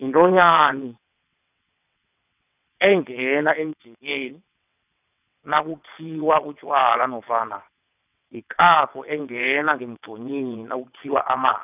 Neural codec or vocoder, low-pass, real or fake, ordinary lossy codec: vocoder, 22.05 kHz, 80 mel bands, WaveNeXt; 3.6 kHz; fake; none